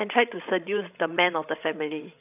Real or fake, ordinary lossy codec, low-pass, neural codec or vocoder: fake; none; 3.6 kHz; codec, 16 kHz, 16 kbps, FreqCodec, larger model